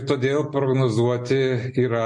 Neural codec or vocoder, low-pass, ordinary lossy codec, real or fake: none; 10.8 kHz; MP3, 48 kbps; real